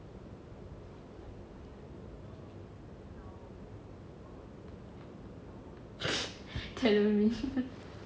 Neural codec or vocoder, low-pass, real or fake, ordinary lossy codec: none; none; real; none